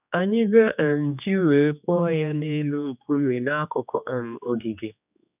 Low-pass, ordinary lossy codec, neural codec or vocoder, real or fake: 3.6 kHz; none; codec, 16 kHz, 2 kbps, X-Codec, HuBERT features, trained on general audio; fake